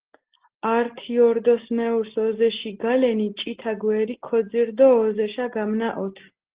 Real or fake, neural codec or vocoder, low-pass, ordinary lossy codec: real; none; 3.6 kHz; Opus, 16 kbps